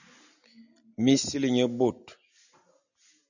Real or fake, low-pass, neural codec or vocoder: real; 7.2 kHz; none